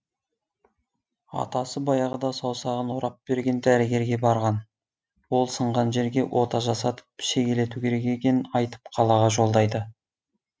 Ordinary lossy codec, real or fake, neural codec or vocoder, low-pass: none; real; none; none